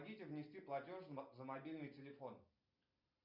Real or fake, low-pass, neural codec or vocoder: real; 5.4 kHz; none